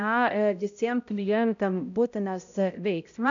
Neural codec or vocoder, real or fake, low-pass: codec, 16 kHz, 0.5 kbps, X-Codec, HuBERT features, trained on balanced general audio; fake; 7.2 kHz